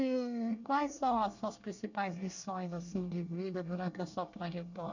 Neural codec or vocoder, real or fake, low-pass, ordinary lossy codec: codec, 24 kHz, 1 kbps, SNAC; fake; 7.2 kHz; none